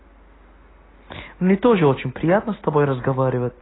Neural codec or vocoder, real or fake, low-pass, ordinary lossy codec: none; real; 7.2 kHz; AAC, 16 kbps